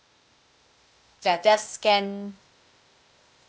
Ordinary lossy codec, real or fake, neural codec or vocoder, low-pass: none; fake; codec, 16 kHz, 0.8 kbps, ZipCodec; none